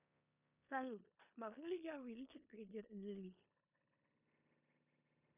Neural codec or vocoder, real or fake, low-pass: codec, 16 kHz in and 24 kHz out, 0.9 kbps, LongCat-Audio-Codec, four codebook decoder; fake; 3.6 kHz